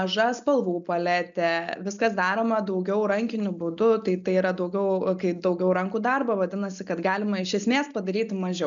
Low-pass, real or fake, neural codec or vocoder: 7.2 kHz; real; none